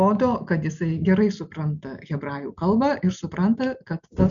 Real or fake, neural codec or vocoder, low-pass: real; none; 7.2 kHz